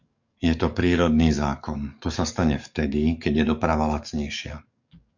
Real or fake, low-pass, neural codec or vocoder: fake; 7.2 kHz; codec, 44.1 kHz, 7.8 kbps, DAC